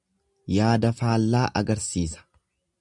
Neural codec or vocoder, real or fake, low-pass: none; real; 10.8 kHz